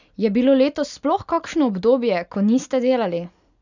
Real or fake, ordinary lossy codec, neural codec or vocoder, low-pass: real; none; none; 7.2 kHz